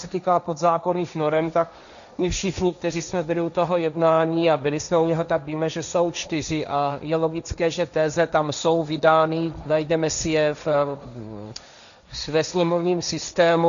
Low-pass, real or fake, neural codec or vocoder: 7.2 kHz; fake; codec, 16 kHz, 1.1 kbps, Voila-Tokenizer